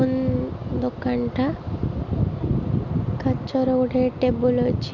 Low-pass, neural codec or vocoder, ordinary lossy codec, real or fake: 7.2 kHz; none; MP3, 64 kbps; real